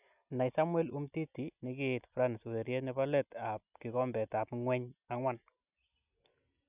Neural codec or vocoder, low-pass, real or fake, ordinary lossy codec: none; 3.6 kHz; real; none